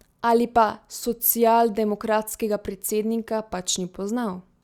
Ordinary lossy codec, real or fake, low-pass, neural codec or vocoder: Opus, 64 kbps; real; 19.8 kHz; none